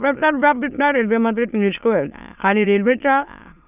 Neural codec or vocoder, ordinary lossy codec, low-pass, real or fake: autoencoder, 22.05 kHz, a latent of 192 numbers a frame, VITS, trained on many speakers; none; 3.6 kHz; fake